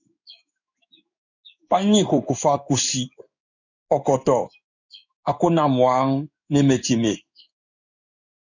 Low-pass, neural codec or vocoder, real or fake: 7.2 kHz; codec, 16 kHz in and 24 kHz out, 1 kbps, XY-Tokenizer; fake